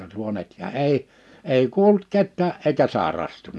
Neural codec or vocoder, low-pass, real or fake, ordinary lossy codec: none; none; real; none